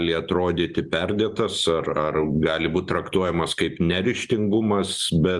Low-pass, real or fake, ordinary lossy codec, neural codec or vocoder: 10.8 kHz; real; Opus, 32 kbps; none